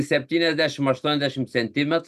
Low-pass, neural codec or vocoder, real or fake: 14.4 kHz; none; real